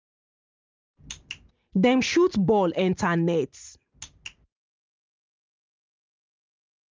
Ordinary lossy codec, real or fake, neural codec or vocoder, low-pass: Opus, 32 kbps; real; none; 7.2 kHz